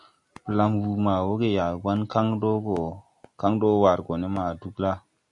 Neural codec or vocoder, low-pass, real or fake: none; 10.8 kHz; real